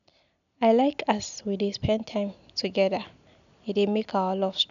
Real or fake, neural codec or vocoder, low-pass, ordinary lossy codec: real; none; 7.2 kHz; none